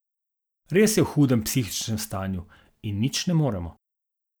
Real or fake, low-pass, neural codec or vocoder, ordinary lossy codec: real; none; none; none